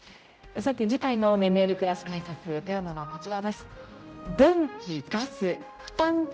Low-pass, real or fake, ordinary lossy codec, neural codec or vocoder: none; fake; none; codec, 16 kHz, 0.5 kbps, X-Codec, HuBERT features, trained on general audio